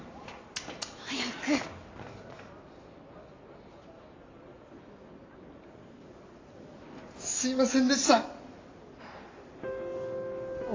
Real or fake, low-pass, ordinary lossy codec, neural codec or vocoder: real; 7.2 kHz; AAC, 32 kbps; none